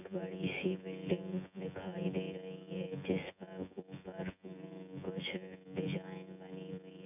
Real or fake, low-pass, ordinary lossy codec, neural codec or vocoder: fake; 3.6 kHz; none; vocoder, 24 kHz, 100 mel bands, Vocos